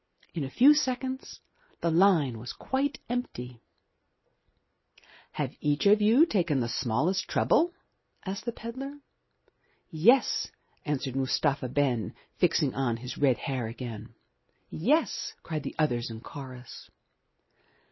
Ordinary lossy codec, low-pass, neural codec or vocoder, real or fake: MP3, 24 kbps; 7.2 kHz; none; real